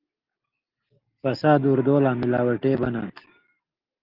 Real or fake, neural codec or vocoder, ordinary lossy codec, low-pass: real; none; Opus, 32 kbps; 5.4 kHz